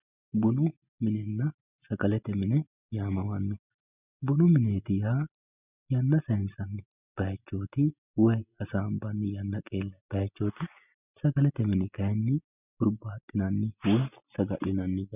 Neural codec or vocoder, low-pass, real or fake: none; 3.6 kHz; real